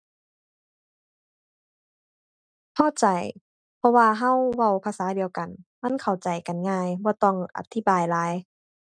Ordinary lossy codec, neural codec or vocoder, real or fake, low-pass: none; none; real; 9.9 kHz